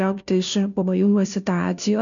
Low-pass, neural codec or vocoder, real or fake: 7.2 kHz; codec, 16 kHz, 0.5 kbps, FunCodec, trained on Chinese and English, 25 frames a second; fake